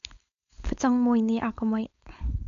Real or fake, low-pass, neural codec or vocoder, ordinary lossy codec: fake; 7.2 kHz; codec, 16 kHz, 4.8 kbps, FACodec; AAC, 64 kbps